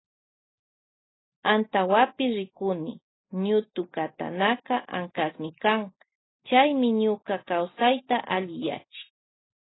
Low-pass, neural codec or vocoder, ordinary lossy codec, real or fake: 7.2 kHz; none; AAC, 16 kbps; real